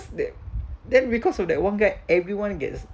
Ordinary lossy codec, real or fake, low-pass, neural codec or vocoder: none; real; none; none